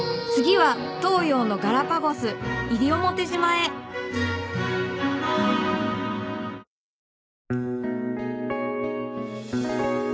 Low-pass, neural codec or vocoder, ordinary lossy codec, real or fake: none; none; none; real